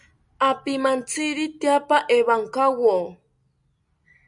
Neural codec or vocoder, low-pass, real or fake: none; 10.8 kHz; real